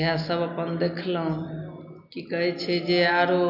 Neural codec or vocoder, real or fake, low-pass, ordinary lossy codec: none; real; 5.4 kHz; none